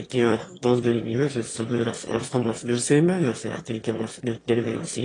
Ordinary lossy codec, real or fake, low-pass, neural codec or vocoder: AAC, 32 kbps; fake; 9.9 kHz; autoencoder, 22.05 kHz, a latent of 192 numbers a frame, VITS, trained on one speaker